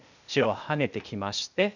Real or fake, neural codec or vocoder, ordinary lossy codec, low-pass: fake; codec, 16 kHz, 0.8 kbps, ZipCodec; none; 7.2 kHz